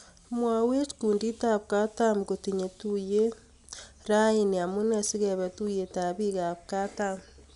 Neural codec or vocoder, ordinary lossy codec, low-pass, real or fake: none; none; 10.8 kHz; real